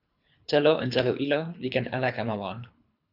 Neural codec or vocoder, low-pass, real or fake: codec, 24 kHz, 3 kbps, HILCodec; 5.4 kHz; fake